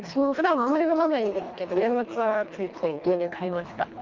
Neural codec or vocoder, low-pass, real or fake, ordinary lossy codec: codec, 24 kHz, 1.5 kbps, HILCodec; 7.2 kHz; fake; Opus, 32 kbps